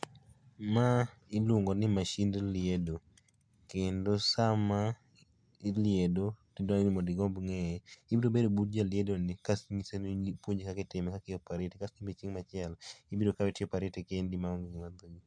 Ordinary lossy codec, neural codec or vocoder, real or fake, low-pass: MP3, 64 kbps; none; real; 9.9 kHz